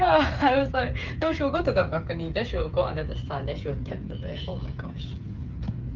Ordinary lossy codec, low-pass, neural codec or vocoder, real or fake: Opus, 24 kbps; 7.2 kHz; codec, 16 kHz, 8 kbps, FreqCodec, smaller model; fake